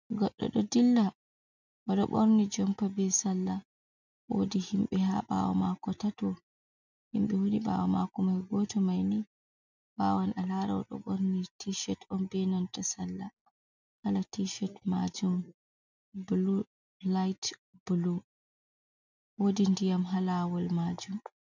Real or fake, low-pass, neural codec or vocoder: real; 7.2 kHz; none